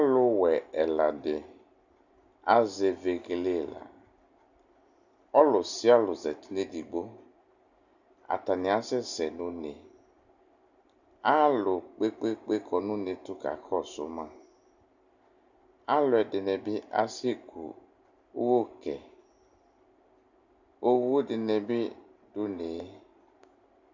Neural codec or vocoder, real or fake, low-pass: none; real; 7.2 kHz